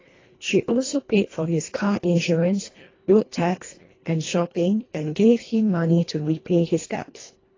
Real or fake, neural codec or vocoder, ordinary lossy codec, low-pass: fake; codec, 24 kHz, 1.5 kbps, HILCodec; AAC, 32 kbps; 7.2 kHz